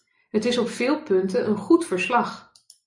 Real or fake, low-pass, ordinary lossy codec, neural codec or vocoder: real; 10.8 kHz; MP3, 96 kbps; none